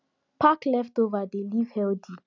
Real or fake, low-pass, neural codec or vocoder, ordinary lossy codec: real; 7.2 kHz; none; none